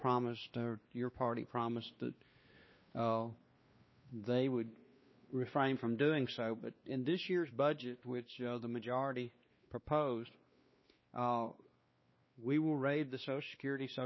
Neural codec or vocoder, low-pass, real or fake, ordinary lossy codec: codec, 16 kHz, 2 kbps, X-Codec, WavLM features, trained on Multilingual LibriSpeech; 7.2 kHz; fake; MP3, 24 kbps